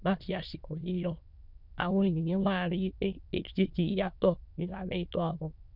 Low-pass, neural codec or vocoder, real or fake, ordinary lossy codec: 5.4 kHz; autoencoder, 22.05 kHz, a latent of 192 numbers a frame, VITS, trained on many speakers; fake; none